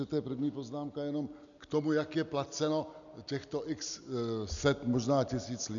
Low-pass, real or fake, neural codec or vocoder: 7.2 kHz; real; none